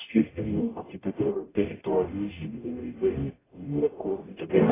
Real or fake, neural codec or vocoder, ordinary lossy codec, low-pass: fake; codec, 44.1 kHz, 0.9 kbps, DAC; AAC, 16 kbps; 3.6 kHz